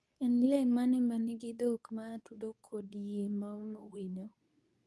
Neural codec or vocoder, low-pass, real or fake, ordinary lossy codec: codec, 24 kHz, 0.9 kbps, WavTokenizer, medium speech release version 2; none; fake; none